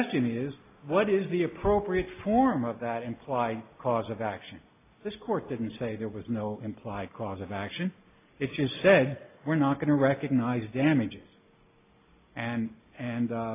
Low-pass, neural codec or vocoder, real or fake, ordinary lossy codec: 3.6 kHz; none; real; AAC, 24 kbps